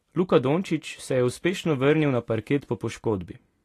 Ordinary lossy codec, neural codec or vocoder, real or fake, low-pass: AAC, 48 kbps; none; real; 14.4 kHz